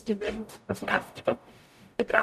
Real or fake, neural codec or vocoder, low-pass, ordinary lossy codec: fake; codec, 44.1 kHz, 0.9 kbps, DAC; 14.4 kHz; none